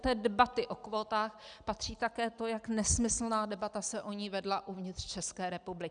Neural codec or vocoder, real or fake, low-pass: none; real; 9.9 kHz